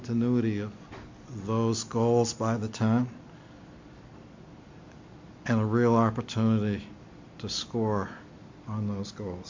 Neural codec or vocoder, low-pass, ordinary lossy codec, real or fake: none; 7.2 kHz; MP3, 48 kbps; real